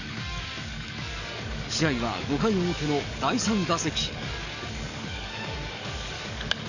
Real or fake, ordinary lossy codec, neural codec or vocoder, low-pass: fake; none; codec, 44.1 kHz, 7.8 kbps, DAC; 7.2 kHz